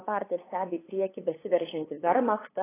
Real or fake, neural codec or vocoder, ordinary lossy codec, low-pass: fake; codec, 16 kHz, 8 kbps, FunCodec, trained on LibriTTS, 25 frames a second; AAC, 16 kbps; 3.6 kHz